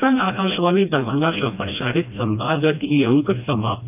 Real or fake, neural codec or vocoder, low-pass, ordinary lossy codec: fake; codec, 16 kHz, 1 kbps, FreqCodec, smaller model; 3.6 kHz; none